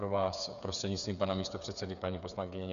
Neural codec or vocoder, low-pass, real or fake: codec, 16 kHz, 8 kbps, FreqCodec, smaller model; 7.2 kHz; fake